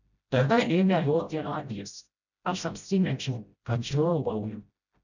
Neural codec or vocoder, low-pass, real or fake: codec, 16 kHz, 0.5 kbps, FreqCodec, smaller model; 7.2 kHz; fake